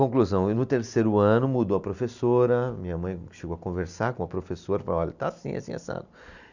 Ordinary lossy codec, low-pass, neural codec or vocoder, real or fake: none; 7.2 kHz; none; real